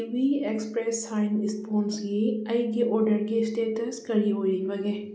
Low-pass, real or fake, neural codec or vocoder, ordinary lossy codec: none; real; none; none